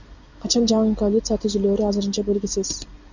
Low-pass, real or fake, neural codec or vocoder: 7.2 kHz; real; none